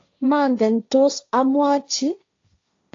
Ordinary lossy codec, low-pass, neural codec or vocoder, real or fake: AAC, 48 kbps; 7.2 kHz; codec, 16 kHz, 1.1 kbps, Voila-Tokenizer; fake